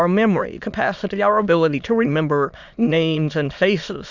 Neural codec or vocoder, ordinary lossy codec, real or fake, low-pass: autoencoder, 22.05 kHz, a latent of 192 numbers a frame, VITS, trained on many speakers; Opus, 64 kbps; fake; 7.2 kHz